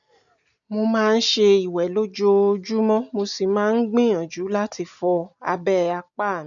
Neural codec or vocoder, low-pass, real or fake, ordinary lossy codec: none; 7.2 kHz; real; none